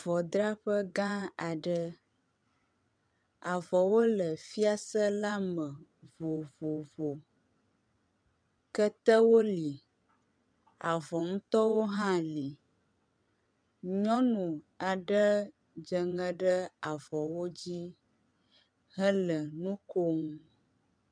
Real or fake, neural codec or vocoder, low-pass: fake; vocoder, 22.05 kHz, 80 mel bands, WaveNeXt; 9.9 kHz